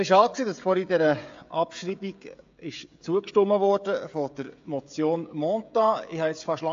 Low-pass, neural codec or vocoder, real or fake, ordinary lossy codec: 7.2 kHz; codec, 16 kHz, 16 kbps, FreqCodec, smaller model; fake; AAC, 48 kbps